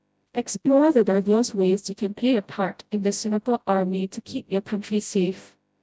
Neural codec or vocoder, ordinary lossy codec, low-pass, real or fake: codec, 16 kHz, 0.5 kbps, FreqCodec, smaller model; none; none; fake